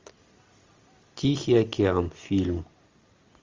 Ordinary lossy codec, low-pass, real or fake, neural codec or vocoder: Opus, 32 kbps; 7.2 kHz; real; none